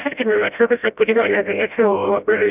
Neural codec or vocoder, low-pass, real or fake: codec, 16 kHz, 0.5 kbps, FreqCodec, smaller model; 3.6 kHz; fake